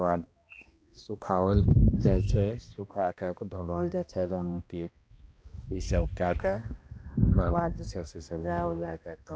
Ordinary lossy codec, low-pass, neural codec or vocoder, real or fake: none; none; codec, 16 kHz, 1 kbps, X-Codec, HuBERT features, trained on balanced general audio; fake